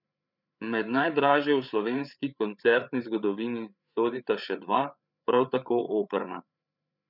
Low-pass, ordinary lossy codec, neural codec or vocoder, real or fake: 5.4 kHz; none; codec, 16 kHz, 8 kbps, FreqCodec, larger model; fake